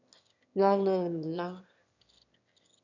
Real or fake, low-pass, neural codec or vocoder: fake; 7.2 kHz; autoencoder, 22.05 kHz, a latent of 192 numbers a frame, VITS, trained on one speaker